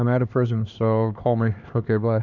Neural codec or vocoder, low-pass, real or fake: codec, 16 kHz, 2 kbps, X-Codec, HuBERT features, trained on LibriSpeech; 7.2 kHz; fake